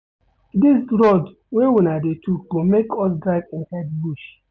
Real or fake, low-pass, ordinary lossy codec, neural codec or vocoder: real; none; none; none